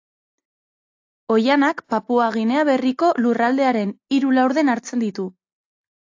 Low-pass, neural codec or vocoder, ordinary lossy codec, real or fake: 7.2 kHz; none; AAC, 48 kbps; real